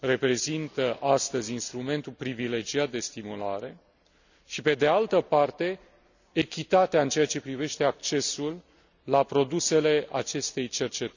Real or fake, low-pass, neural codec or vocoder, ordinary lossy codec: real; 7.2 kHz; none; none